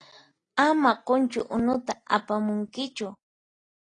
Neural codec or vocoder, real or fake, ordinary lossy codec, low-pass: none; real; AAC, 32 kbps; 9.9 kHz